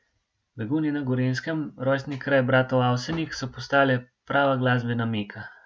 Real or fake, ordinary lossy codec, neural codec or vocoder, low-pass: real; none; none; none